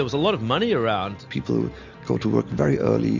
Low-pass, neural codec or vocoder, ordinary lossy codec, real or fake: 7.2 kHz; none; MP3, 64 kbps; real